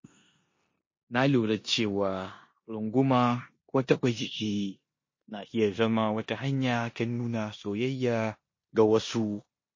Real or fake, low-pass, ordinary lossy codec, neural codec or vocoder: fake; 7.2 kHz; MP3, 32 kbps; codec, 16 kHz in and 24 kHz out, 0.9 kbps, LongCat-Audio-Codec, four codebook decoder